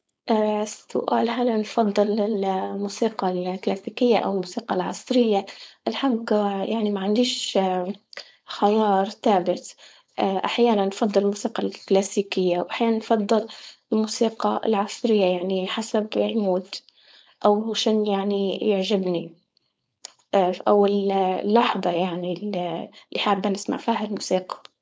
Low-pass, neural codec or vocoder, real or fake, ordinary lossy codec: none; codec, 16 kHz, 4.8 kbps, FACodec; fake; none